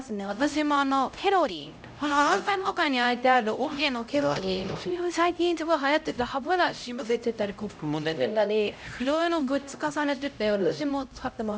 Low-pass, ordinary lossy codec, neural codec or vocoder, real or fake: none; none; codec, 16 kHz, 0.5 kbps, X-Codec, HuBERT features, trained on LibriSpeech; fake